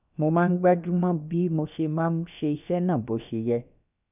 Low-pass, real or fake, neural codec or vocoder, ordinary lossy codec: 3.6 kHz; fake; codec, 16 kHz, about 1 kbps, DyCAST, with the encoder's durations; none